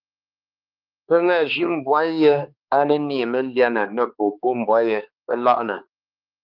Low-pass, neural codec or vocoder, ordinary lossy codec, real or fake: 5.4 kHz; codec, 16 kHz, 2 kbps, X-Codec, HuBERT features, trained on balanced general audio; Opus, 24 kbps; fake